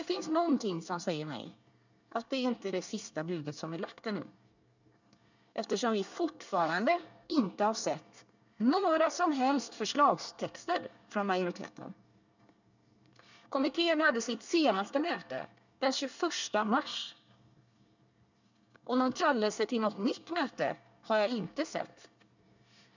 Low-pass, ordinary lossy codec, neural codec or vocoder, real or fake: 7.2 kHz; none; codec, 24 kHz, 1 kbps, SNAC; fake